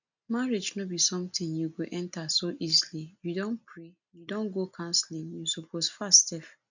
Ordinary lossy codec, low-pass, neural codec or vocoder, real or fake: none; 7.2 kHz; none; real